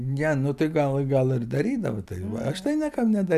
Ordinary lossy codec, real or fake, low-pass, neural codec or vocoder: AAC, 96 kbps; real; 14.4 kHz; none